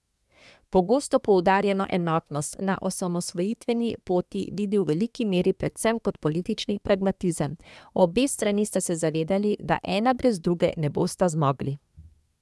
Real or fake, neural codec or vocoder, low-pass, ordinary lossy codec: fake; codec, 24 kHz, 1 kbps, SNAC; none; none